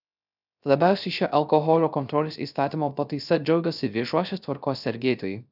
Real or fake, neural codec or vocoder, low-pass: fake; codec, 16 kHz, 0.3 kbps, FocalCodec; 5.4 kHz